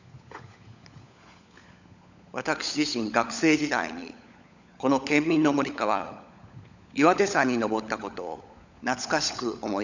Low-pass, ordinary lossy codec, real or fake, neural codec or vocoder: 7.2 kHz; none; fake; codec, 16 kHz, 16 kbps, FunCodec, trained on LibriTTS, 50 frames a second